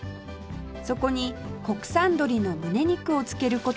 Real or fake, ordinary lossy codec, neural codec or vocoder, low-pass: real; none; none; none